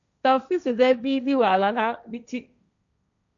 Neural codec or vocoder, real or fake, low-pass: codec, 16 kHz, 1.1 kbps, Voila-Tokenizer; fake; 7.2 kHz